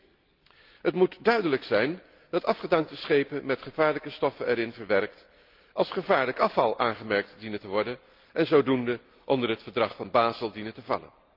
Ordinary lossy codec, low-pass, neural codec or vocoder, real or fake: Opus, 32 kbps; 5.4 kHz; none; real